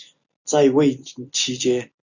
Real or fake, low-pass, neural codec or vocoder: real; 7.2 kHz; none